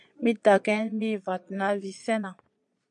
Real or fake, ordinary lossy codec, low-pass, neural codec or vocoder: fake; MP3, 96 kbps; 9.9 kHz; vocoder, 22.05 kHz, 80 mel bands, Vocos